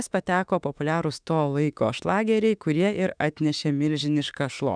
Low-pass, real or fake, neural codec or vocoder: 9.9 kHz; fake; autoencoder, 48 kHz, 32 numbers a frame, DAC-VAE, trained on Japanese speech